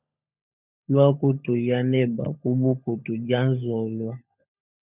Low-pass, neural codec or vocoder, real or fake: 3.6 kHz; codec, 16 kHz, 16 kbps, FunCodec, trained on LibriTTS, 50 frames a second; fake